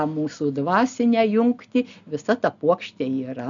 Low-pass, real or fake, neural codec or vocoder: 7.2 kHz; real; none